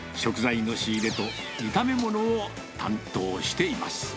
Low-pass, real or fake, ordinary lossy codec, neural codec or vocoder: none; real; none; none